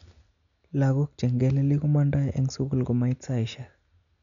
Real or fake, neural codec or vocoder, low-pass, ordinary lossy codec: real; none; 7.2 kHz; none